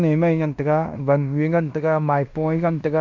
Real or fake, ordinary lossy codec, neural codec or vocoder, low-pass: fake; MP3, 64 kbps; codec, 16 kHz in and 24 kHz out, 0.9 kbps, LongCat-Audio-Codec, fine tuned four codebook decoder; 7.2 kHz